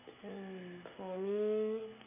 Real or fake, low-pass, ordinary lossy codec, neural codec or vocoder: real; 3.6 kHz; AAC, 32 kbps; none